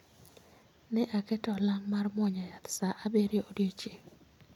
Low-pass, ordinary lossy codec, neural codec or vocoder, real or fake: 19.8 kHz; none; none; real